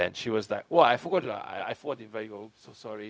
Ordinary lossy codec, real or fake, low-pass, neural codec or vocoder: none; fake; none; codec, 16 kHz, 0.4 kbps, LongCat-Audio-Codec